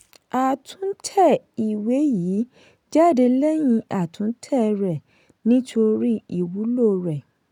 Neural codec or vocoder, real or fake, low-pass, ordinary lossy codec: none; real; 19.8 kHz; none